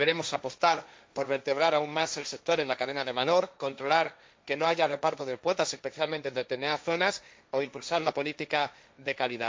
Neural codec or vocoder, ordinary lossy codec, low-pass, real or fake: codec, 16 kHz, 1.1 kbps, Voila-Tokenizer; none; none; fake